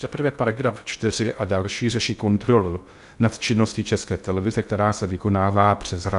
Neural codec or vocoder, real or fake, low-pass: codec, 16 kHz in and 24 kHz out, 0.6 kbps, FocalCodec, streaming, 2048 codes; fake; 10.8 kHz